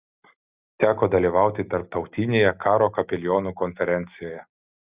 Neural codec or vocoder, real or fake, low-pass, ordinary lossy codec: none; real; 3.6 kHz; Opus, 64 kbps